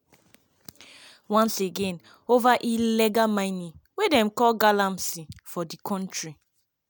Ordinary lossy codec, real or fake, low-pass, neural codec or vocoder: none; real; none; none